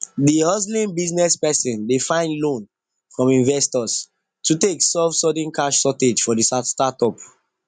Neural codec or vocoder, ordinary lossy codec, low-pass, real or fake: none; none; 9.9 kHz; real